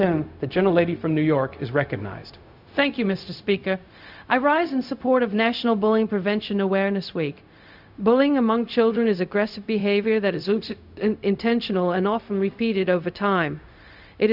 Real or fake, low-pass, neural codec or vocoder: fake; 5.4 kHz; codec, 16 kHz, 0.4 kbps, LongCat-Audio-Codec